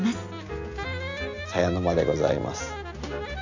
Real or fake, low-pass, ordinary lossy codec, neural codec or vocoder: real; 7.2 kHz; AAC, 48 kbps; none